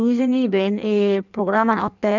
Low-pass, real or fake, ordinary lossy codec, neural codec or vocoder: 7.2 kHz; fake; none; codec, 16 kHz in and 24 kHz out, 1.1 kbps, FireRedTTS-2 codec